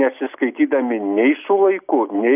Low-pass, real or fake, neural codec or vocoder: 3.6 kHz; real; none